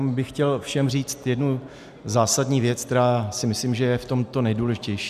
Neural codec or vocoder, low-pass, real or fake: none; 14.4 kHz; real